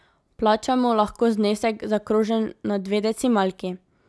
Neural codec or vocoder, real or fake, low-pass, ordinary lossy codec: none; real; none; none